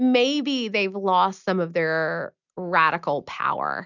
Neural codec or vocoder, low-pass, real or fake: none; 7.2 kHz; real